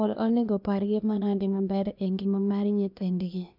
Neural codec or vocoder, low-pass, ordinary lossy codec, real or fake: codec, 16 kHz, 0.8 kbps, ZipCodec; 5.4 kHz; none; fake